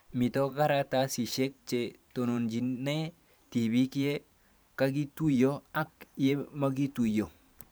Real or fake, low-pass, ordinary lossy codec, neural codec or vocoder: fake; none; none; vocoder, 44.1 kHz, 128 mel bands every 512 samples, BigVGAN v2